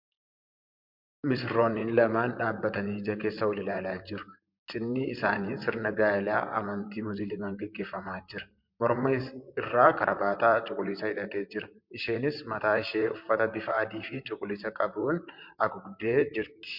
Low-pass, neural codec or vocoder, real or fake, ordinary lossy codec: 5.4 kHz; vocoder, 44.1 kHz, 128 mel bands, Pupu-Vocoder; fake; MP3, 48 kbps